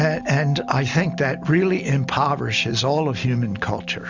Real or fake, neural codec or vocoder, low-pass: real; none; 7.2 kHz